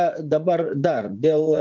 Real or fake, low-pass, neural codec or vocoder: fake; 7.2 kHz; vocoder, 44.1 kHz, 80 mel bands, Vocos